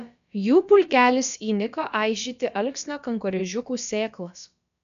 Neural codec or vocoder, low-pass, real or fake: codec, 16 kHz, about 1 kbps, DyCAST, with the encoder's durations; 7.2 kHz; fake